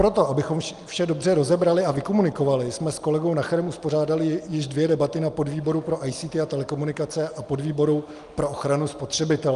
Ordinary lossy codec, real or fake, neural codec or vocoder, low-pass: Opus, 32 kbps; real; none; 10.8 kHz